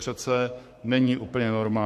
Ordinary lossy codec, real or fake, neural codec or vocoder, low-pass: MP3, 64 kbps; fake; codec, 44.1 kHz, 7.8 kbps, Pupu-Codec; 14.4 kHz